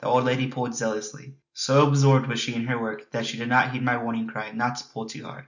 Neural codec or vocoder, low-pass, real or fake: none; 7.2 kHz; real